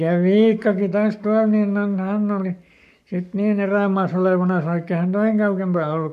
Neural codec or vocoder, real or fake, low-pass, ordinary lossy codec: codec, 44.1 kHz, 7.8 kbps, Pupu-Codec; fake; 14.4 kHz; none